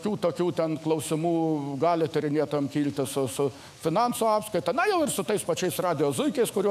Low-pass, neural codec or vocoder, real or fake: 14.4 kHz; autoencoder, 48 kHz, 128 numbers a frame, DAC-VAE, trained on Japanese speech; fake